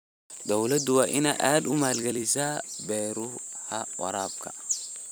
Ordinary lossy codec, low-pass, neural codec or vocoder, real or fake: none; none; vocoder, 44.1 kHz, 128 mel bands every 256 samples, BigVGAN v2; fake